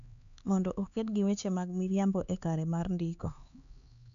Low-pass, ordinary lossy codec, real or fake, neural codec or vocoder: 7.2 kHz; AAC, 96 kbps; fake; codec, 16 kHz, 4 kbps, X-Codec, HuBERT features, trained on LibriSpeech